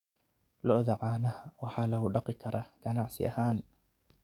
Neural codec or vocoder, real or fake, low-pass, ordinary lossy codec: codec, 44.1 kHz, 7.8 kbps, DAC; fake; 19.8 kHz; none